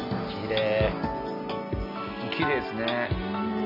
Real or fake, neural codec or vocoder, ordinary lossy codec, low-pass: real; none; none; 5.4 kHz